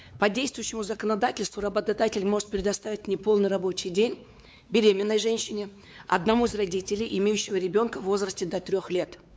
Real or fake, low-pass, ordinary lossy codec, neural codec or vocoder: fake; none; none; codec, 16 kHz, 4 kbps, X-Codec, WavLM features, trained on Multilingual LibriSpeech